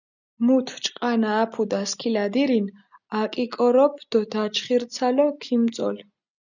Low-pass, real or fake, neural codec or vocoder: 7.2 kHz; real; none